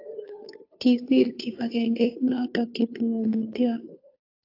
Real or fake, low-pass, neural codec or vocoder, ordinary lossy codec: fake; 5.4 kHz; codec, 16 kHz, 2 kbps, FunCodec, trained on Chinese and English, 25 frames a second; AAC, 32 kbps